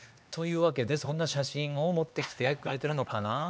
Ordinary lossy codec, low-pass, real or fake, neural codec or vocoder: none; none; fake; codec, 16 kHz, 2 kbps, X-Codec, HuBERT features, trained on LibriSpeech